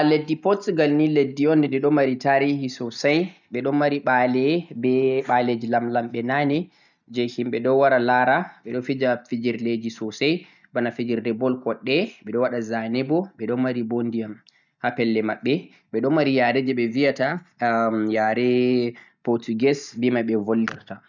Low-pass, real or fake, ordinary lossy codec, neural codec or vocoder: 7.2 kHz; real; none; none